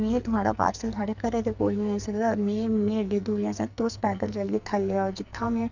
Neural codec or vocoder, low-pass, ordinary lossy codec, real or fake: codec, 44.1 kHz, 2.6 kbps, SNAC; 7.2 kHz; none; fake